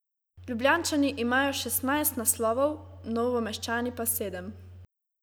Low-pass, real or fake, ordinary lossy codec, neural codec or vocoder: none; real; none; none